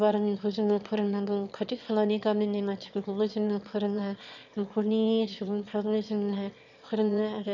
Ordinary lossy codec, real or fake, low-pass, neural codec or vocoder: none; fake; 7.2 kHz; autoencoder, 22.05 kHz, a latent of 192 numbers a frame, VITS, trained on one speaker